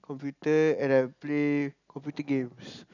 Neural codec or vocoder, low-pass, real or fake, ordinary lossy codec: none; 7.2 kHz; real; none